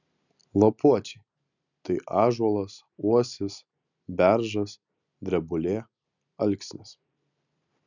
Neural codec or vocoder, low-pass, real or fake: none; 7.2 kHz; real